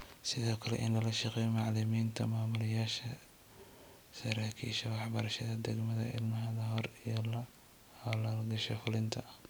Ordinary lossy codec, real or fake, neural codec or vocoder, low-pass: none; real; none; none